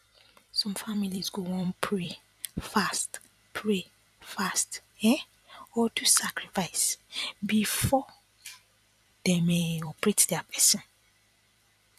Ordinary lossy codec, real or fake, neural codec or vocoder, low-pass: none; fake; vocoder, 44.1 kHz, 128 mel bands every 256 samples, BigVGAN v2; 14.4 kHz